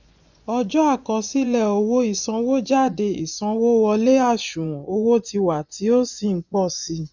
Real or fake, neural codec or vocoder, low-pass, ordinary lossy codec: fake; vocoder, 24 kHz, 100 mel bands, Vocos; 7.2 kHz; none